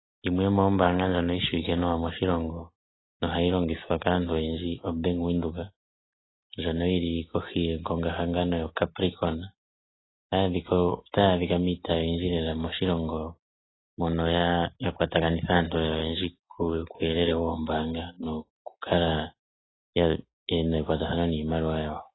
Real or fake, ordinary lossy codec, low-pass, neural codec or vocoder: real; AAC, 16 kbps; 7.2 kHz; none